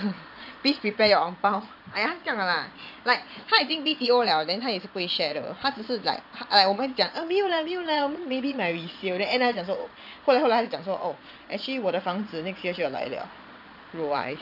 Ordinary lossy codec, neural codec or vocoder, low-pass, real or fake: none; vocoder, 22.05 kHz, 80 mel bands, Vocos; 5.4 kHz; fake